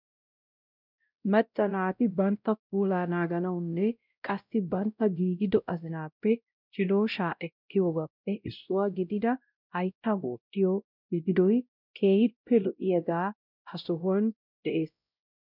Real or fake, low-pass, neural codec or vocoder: fake; 5.4 kHz; codec, 16 kHz, 0.5 kbps, X-Codec, WavLM features, trained on Multilingual LibriSpeech